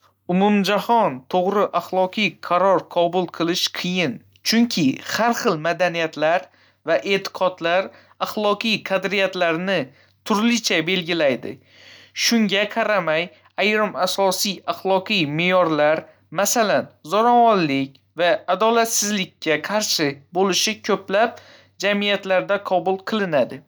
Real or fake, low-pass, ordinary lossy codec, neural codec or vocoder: real; none; none; none